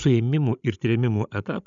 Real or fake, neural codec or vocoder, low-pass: fake; codec, 16 kHz, 16 kbps, FreqCodec, larger model; 7.2 kHz